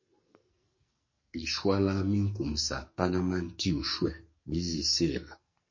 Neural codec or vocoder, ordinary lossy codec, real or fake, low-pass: codec, 44.1 kHz, 2.6 kbps, SNAC; MP3, 32 kbps; fake; 7.2 kHz